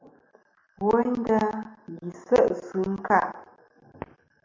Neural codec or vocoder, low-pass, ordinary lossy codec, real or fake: none; 7.2 kHz; MP3, 48 kbps; real